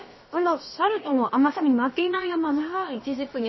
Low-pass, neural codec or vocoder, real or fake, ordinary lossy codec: 7.2 kHz; codec, 16 kHz, about 1 kbps, DyCAST, with the encoder's durations; fake; MP3, 24 kbps